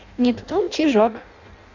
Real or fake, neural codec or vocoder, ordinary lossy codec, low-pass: fake; codec, 16 kHz in and 24 kHz out, 0.6 kbps, FireRedTTS-2 codec; none; 7.2 kHz